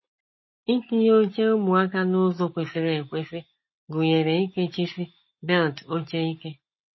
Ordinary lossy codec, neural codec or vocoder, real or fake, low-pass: MP3, 24 kbps; none; real; 7.2 kHz